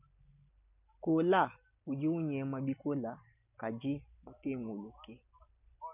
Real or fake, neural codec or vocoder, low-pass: real; none; 3.6 kHz